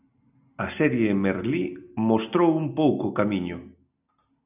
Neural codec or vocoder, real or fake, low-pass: none; real; 3.6 kHz